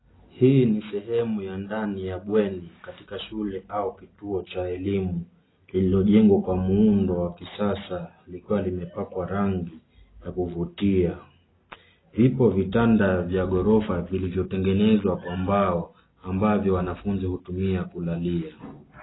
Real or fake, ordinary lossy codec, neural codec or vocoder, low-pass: real; AAC, 16 kbps; none; 7.2 kHz